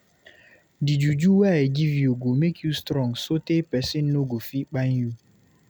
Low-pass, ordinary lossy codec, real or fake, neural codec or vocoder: none; none; real; none